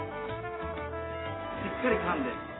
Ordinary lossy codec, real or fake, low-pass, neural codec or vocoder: AAC, 16 kbps; real; 7.2 kHz; none